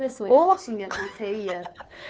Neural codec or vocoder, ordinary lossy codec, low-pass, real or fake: codec, 16 kHz, 2 kbps, FunCodec, trained on Chinese and English, 25 frames a second; none; none; fake